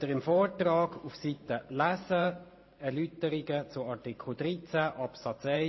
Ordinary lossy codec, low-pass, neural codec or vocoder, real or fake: MP3, 24 kbps; 7.2 kHz; vocoder, 44.1 kHz, 128 mel bands every 512 samples, BigVGAN v2; fake